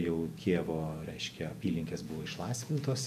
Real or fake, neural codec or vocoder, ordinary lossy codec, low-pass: fake; vocoder, 48 kHz, 128 mel bands, Vocos; AAC, 96 kbps; 14.4 kHz